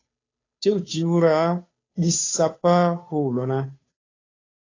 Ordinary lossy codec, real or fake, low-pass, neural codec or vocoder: AAC, 32 kbps; fake; 7.2 kHz; codec, 16 kHz, 2 kbps, FunCodec, trained on Chinese and English, 25 frames a second